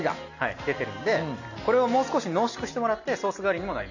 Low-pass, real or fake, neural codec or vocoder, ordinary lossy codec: 7.2 kHz; real; none; none